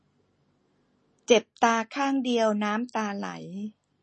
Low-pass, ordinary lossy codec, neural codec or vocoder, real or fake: 10.8 kHz; MP3, 32 kbps; none; real